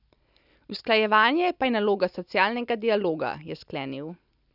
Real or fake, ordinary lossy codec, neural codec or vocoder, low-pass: real; none; none; 5.4 kHz